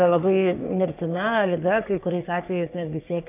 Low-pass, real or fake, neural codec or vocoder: 3.6 kHz; fake; codec, 44.1 kHz, 3.4 kbps, Pupu-Codec